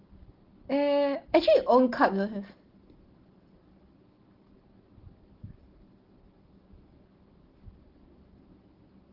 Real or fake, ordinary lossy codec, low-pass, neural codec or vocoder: real; Opus, 16 kbps; 5.4 kHz; none